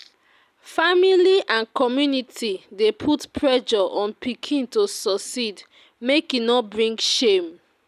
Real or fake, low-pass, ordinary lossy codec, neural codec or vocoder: real; 14.4 kHz; none; none